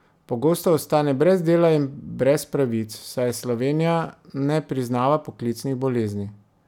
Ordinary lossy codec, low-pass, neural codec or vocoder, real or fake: none; 19.8 kHz; none; real